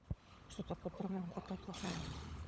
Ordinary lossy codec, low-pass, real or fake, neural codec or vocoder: none; none; fake; codec, 16 kHz, 16 kbps, FunCodec, trained on LibriTTS, 50 frames a second